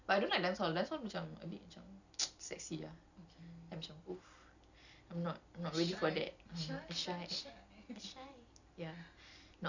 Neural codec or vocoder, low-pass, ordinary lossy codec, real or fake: none; 7.2 kHz; none; real